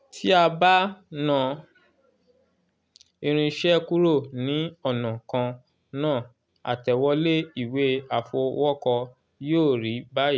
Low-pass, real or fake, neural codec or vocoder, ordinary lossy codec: none; real; none; none